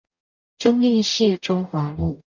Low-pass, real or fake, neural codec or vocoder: 7.2 kHz; fake; codec, 44.1 kHz, 0.9 kbps, DAC